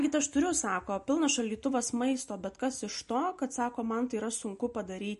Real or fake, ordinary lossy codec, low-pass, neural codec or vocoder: real; MP3, 48 kbps; 14.4 kHz; none